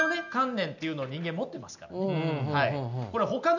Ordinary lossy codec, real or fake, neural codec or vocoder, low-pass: none; real; none; 7.2 kHz